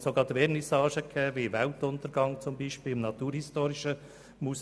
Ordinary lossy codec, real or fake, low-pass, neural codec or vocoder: none; real; none; none